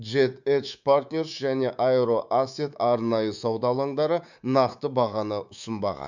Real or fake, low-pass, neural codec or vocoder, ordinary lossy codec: fake; 7.2 kHz; codec, 24 kHz, 3.1 kbps, DualCodec; none